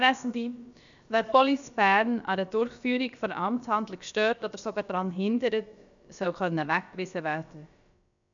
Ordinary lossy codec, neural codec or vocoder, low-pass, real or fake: none; codec, 16 kHz, about 1 kbps, DyCAST, with the encoder's durations; 7.2 kHz; fake